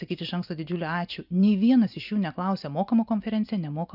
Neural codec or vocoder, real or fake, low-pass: none; real; 5.4 kHz